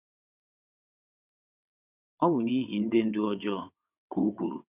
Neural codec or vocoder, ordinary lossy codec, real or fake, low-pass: vocoder, 22.05 kHz, 80 mel bands, WaveNeXt; none; fake; 3.6 kHz